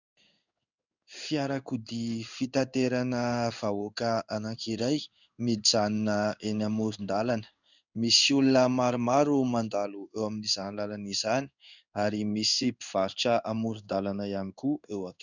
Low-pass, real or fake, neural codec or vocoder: 7.2 kHz; fake; codec, 16 kHz in and 24 kHz out, 1 kbps, XY-Tokenizer